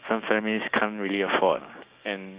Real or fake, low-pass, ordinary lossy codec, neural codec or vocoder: fake; 3.6 kHz; Opus, 64 kbps; codec, 16 kHz, 6 kbps, DAC